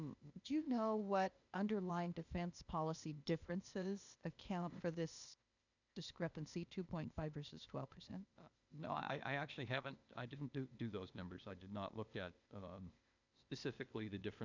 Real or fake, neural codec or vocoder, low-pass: fake; codec, 16 kHz, 0.8 kbps, ZipCodec; 7.2 kHz